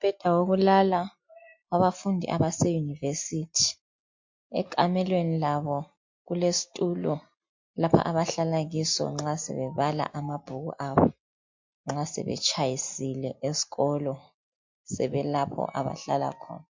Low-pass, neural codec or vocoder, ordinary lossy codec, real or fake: 7.2 kHz; none; MP3, 48 kbps; real